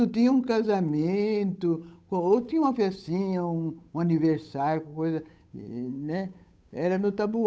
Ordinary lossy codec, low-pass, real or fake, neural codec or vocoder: none; none; fake; codec, 16 kHz, 8 kbps, FunCodec, trained on Chinese and English, 25 frames a second